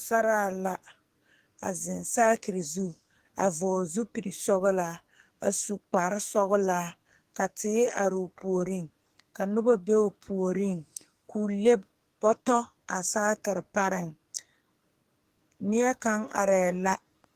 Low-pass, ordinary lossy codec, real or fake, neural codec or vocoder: 14.4 kHz; Opus, 24 kbps; fake; codec, 44.1 kHz, 2.6 kbps, SNAC